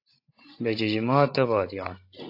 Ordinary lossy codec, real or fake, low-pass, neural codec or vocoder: AAC, 32 kbps; fake; 5.4 kHz; codec, 16 kHz, 16 kbps, FreqCodec, larger model